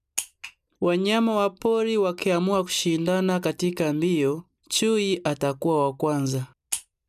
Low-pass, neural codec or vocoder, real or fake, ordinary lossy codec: 14.4 kHz; none; real; none